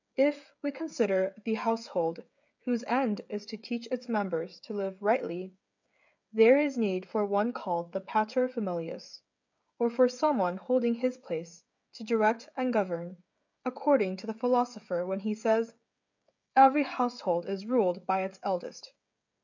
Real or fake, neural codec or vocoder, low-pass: fake; codec, 16 kHz, 16 kbps, FreqCodec, smaller model; 7.2 kHz